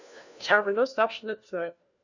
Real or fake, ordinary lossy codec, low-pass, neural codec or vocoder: fake; none; 7.2 kHz; codec, 16 kHz, 1 kbps, FunCodec, trained on LibriTTS, 50 frames a second